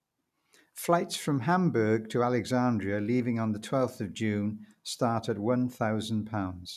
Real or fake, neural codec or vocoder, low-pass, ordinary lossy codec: real; none; 14.4 kHz; none